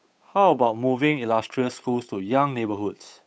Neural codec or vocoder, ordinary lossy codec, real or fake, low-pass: codec, 16 kHz, 8 kbps, FunCodec, trained on Chinese and English, 25 frames a second; none; fake; none